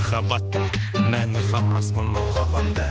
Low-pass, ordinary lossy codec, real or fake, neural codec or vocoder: none; none; fake; codec, 16 kHz, 2 kbps, X-Codec, HuBERT features, trained on general audio